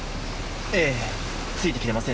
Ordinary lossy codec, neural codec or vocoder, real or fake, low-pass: none; none; real; none